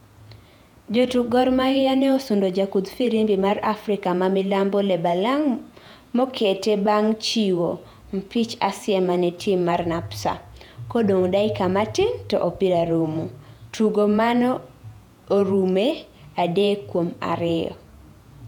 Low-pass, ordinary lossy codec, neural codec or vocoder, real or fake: 19.8 kHz; none; vocoder, 48 kHz, 128 mel bands, Vocos; fake